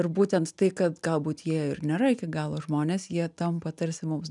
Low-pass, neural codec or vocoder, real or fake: 10.8 kHz; none; real